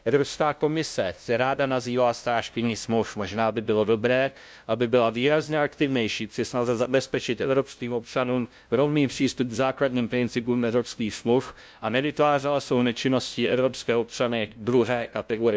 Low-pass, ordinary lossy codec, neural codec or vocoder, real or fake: none; none; codec, 16 kHz, 0.5 kbps, FunCodec, trained on LibriTTS, 25 frames a second; fake